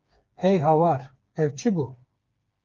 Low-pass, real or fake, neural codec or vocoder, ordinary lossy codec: 7.2 kHz; fake; codec, 16 kHz, 4 kbps, FreqCodec, smaller model; Opus, 32 kbps